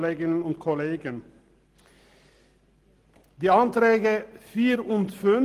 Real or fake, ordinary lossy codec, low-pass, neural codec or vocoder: real; Opus, 24 kbps; 14.4 kHz; none